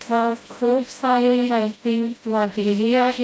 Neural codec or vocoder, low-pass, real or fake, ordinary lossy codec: codec, 16 kHz, 0.5 kbps, FreqCodec, smaller model; none; fake; none